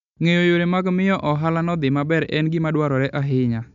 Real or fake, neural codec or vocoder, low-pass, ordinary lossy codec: real; none; 7.2 kHz; none